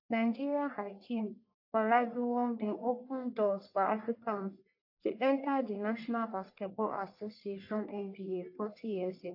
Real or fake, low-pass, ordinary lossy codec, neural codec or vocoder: fake; 5.4 kHz; AAC, 32 kbps; codec, 44.1 kHz, 1.7 kbps, Pupu-Codec